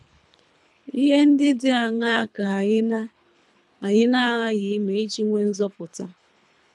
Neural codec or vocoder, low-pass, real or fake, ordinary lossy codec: codec, 24 kHz, 3 kbps, HILCodec; none; fake; none